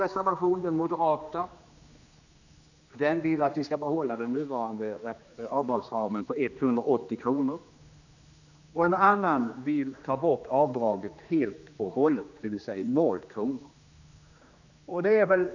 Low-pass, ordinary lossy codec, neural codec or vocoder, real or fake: 7.2 kHz; none; codec, 16 kHz, 2 kbps, X-Codec, HuBERT features, trained on general audio; fake